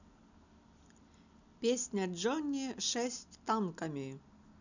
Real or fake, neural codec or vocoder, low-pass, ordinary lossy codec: real; none; 7.2 kHz; none